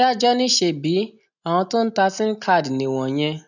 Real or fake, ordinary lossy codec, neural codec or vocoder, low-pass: real; none; none; 7.2 kHz